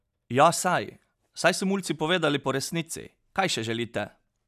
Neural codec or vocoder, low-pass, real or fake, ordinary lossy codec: none; 14.4 kHz; real; none